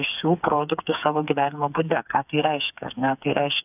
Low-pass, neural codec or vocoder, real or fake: 3.6 kHz; codec, 16 kHz, 4 kbps, FreqCodec, smaller model; fake